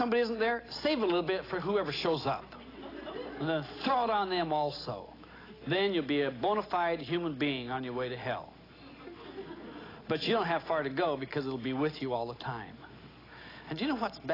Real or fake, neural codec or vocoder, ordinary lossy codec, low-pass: real; none; AAC, 24 kbps; 5.4 kHz